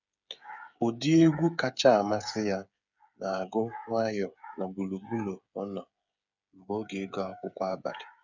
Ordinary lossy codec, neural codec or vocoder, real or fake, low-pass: none; codec, 16 kHz, 8 kbps, FreqCodec, smaller model; fake; 7.2 kHz